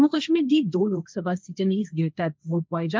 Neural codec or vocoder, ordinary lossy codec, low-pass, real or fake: codec, 16 kHz, 1.1 kbps, Voila-Tokenizer; none; none; fake